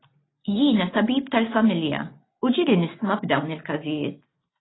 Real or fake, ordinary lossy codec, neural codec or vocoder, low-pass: real; AAC, 16 kbps; none; 7.2 kHz